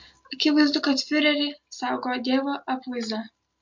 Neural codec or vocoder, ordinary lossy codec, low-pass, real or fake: none; MP3, 48 kbps; 7.2 kHz; real